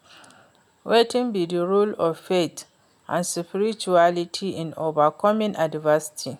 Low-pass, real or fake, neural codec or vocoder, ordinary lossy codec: 19.8 kHz; real; none; none